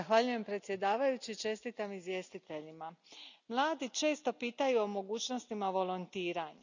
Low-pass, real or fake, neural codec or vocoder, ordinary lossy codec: 7.2 kHz; real; none; none